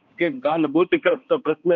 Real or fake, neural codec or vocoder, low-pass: fake; codec, 16 kHz, 1 kbps, X-Codec, HuBERT features, trained on balanced general audio; 7.2 kHz